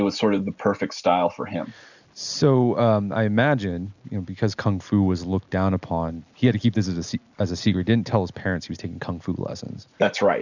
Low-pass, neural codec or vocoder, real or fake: 7.2 kHz; none; real